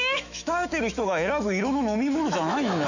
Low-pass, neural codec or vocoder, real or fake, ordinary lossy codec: 7.2 kHz; autoencoder, 48 kHz, 128 numbers a frame, DAC-VAE, trained on Japanese speech; fake; none